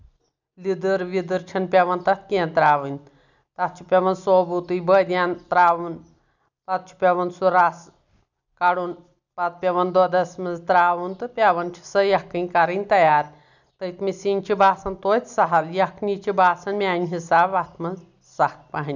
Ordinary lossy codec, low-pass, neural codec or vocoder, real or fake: none; 7.2 kHz; none; real